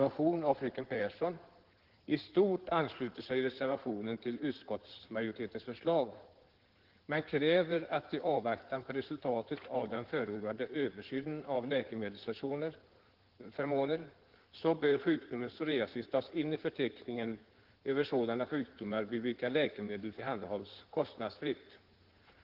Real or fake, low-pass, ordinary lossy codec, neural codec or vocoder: fake; 5.4 kHz; Opus, 16 kbps; codec, 16 kHz in and 24 kHz out, 2.2 kbps, FireRedTTS-2 codec